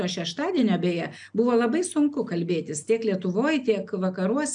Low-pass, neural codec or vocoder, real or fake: 9.9 kHz; none; real